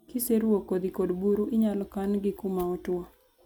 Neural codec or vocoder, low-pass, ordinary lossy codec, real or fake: none; none; none; real